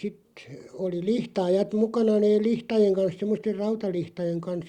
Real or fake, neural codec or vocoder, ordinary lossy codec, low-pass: real; none; Opus, 64 kbps; 19.8 kHz